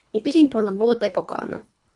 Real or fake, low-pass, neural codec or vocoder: fake; 10.8 kHz; codec, 24 kHz, 1.5 kbps, HILCodec